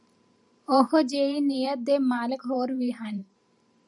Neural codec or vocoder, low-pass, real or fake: vocoder, 44.1 kHz, 128 mel bands every 512 samples, BigVGAN v2; 10.8 kHz; fake